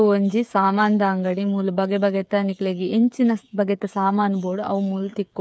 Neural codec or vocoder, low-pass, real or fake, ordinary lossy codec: codec, 16 kHz, 8 kbps, FreqCodec, smaller model; none; fake; none